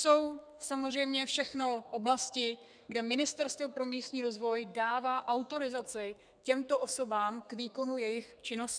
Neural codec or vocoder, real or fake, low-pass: codec, 32 kHz, 1.9 kbps, SNAC; fake; 9.9 kHz